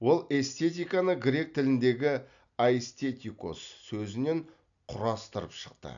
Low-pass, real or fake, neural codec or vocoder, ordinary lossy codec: 7.2 kHz; real; none; none